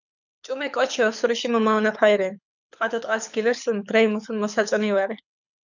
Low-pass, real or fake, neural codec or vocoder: 7.2 kHz; fake; codec, 24 kHz, 6 kbps, HILCodec